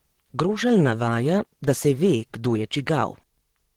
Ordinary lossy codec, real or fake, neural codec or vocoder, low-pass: Opus, 16 kbps; fake; vocoder, 44.1 kHz, 128 mel bands, Pupu-Vocoder; 19.8 kHz